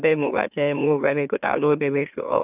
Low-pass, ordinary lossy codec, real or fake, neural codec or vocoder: 3.6 kHz; none; fake; autoencoder, 44.1 kHz, a latent of 192 numbers a frame, MeloTTS